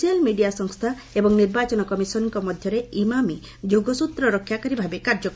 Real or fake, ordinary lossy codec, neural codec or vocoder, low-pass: real; none; none; none